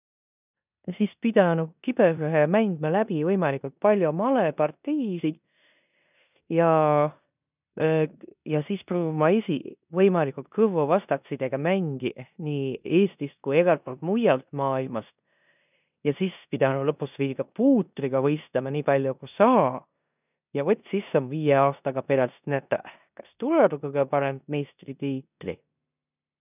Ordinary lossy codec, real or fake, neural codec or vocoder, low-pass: none; fake; codec, 16 kHz in and 24 kHz out, 0.9 kbps, LongCat-Audio-Codec, four codebook decoder; 3.6 kHz